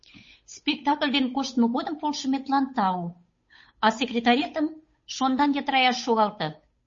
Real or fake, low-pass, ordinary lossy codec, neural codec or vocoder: fake; 7.2 kHz; MP3, 32 kbps; codec, 16 kHz, 8 kbps, FunCodec, trained on Chinese and English, 25 frames a second